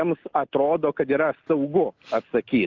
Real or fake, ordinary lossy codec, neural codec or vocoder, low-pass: real; Opus, 24 kbps; none; 7.2 kHz